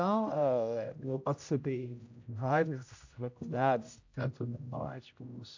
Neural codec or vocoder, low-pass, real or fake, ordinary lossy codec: codec, 16 kHz, 0.5 kbps, X-Codec, HuBERT features, trained on general audio; 7.2 kHz; fake; AAC, 48 kbps